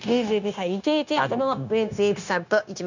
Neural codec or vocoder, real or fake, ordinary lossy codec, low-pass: codec, 16 kHz, 0.9 kbps, LongCat-Audio-Codec; fake; none; 7.2 kHz